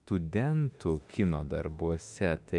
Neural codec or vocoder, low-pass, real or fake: autoencoder, 48 kHz, 32 numbers a frame, DAC-VAE, trained on Japanese speech; 10.8 kHz; fake